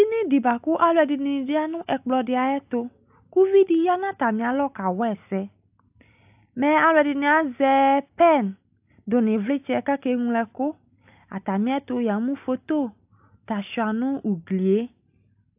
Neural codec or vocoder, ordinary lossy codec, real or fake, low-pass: none; AAC, 32 kbps; real; 3.6 kHz